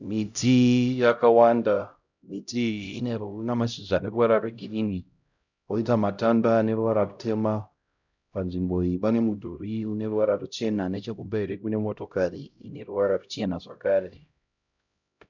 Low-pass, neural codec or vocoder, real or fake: 7.2 kHz; codec, 16 kHz, 0.5 kbps, X-Codec, HuBERT features, trained on LibriSpeech; fake